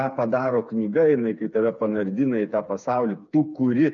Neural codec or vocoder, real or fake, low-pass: codec, 16 kHz, 4 kbps, FreqCodec, smaller model; fake; 7.2 kHz